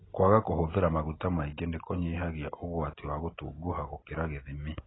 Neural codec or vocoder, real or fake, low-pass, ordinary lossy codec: none; real; 7.2 kHz; AAC, 16 kbps